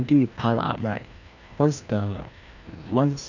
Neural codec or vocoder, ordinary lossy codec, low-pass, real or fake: codec, 16 kHz, 1 kbps, FreqCodec, larger model; none; 7.2 kHz; fake